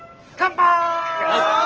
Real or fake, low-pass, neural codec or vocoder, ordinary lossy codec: real; 7.2 kHz; none; Opus, 16 kbps